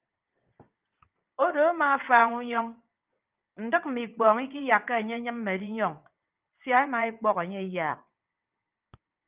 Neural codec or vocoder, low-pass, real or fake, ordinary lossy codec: vocoder, 22.05 kHz, 80 mel bands, WaveNeXt; 3.6 kHz; fake; Opus, 24 kbps